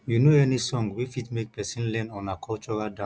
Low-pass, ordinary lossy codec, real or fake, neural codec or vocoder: none; none; real; none